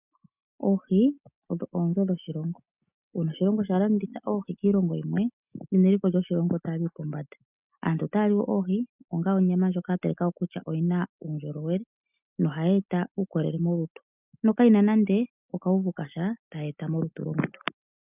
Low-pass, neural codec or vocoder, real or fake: 3.6 kHz; none; real